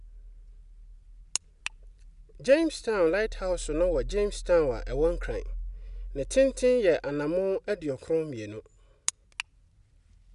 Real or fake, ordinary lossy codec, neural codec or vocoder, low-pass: real; none; none; 10.8 kHz